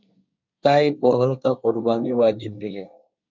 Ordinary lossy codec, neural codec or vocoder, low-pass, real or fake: MP3, 64 kbps; codec, 24 kHz, 1 kbps, SNAC; 7.2 kHz; fake